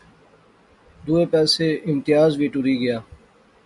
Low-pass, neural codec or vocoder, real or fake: 10.8 kHz; none; real